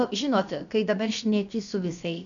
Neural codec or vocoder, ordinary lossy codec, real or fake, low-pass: codec, 16 kHz, about 1 kbps, DyCAST, with the encoder's durations; MP3, 96 kbps; fake; 7.2 kHz